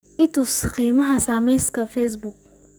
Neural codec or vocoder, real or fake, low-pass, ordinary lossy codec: codec, 44.1 kHz, 2.6 kbps, SNAC; fake; none; none